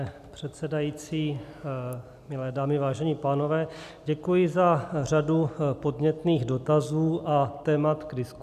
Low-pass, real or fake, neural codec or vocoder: 14.4 kHz; real; none